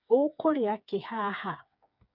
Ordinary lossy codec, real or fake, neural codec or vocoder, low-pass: none; fake; codec, 16 kHz, 4 kbps, FreqCodec, smaller model; 5.4 kHz